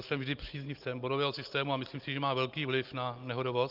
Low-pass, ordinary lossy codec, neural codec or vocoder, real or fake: 5.4 kHz; Opus, 24 kbps; none; real